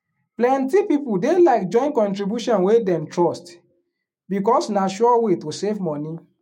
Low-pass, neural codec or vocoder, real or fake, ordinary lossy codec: 19.8 kHz; autoencoder, 48 kHz, 128 numbers a frame, DAC-VAE, trained on Japanese speech; fake; MP3, 64 kbps